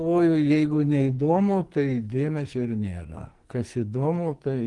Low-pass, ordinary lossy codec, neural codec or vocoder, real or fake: 10.8 kHz; Opus, 24 kbps; codec, 44.1 kHz, 2.6 kbps, SNAC; fake